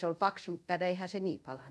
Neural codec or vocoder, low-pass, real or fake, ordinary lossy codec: codec, 24 kHz, 0.5 kbps, DualCodec; none; fake; none